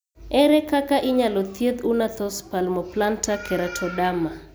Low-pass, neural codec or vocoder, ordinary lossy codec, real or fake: none; none; none; real